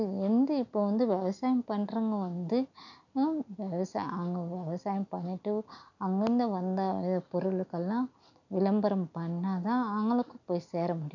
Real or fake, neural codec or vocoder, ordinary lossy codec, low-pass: real; none; none; 7.2 kHz